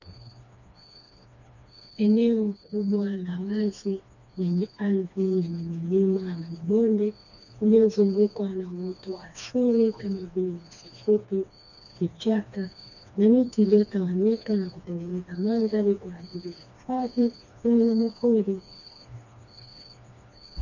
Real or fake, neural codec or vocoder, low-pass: fake; codec, 16 kHz, 2 kbps, FreqCodec, smaller model; 7.2 kHz